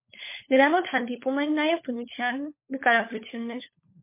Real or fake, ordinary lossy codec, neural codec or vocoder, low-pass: fake; MP3, 24 kbps; codec, 16 kHz, 16 kbps, FunCodec, trained on LibriTTS, 50 frames a second; 3.6 kHz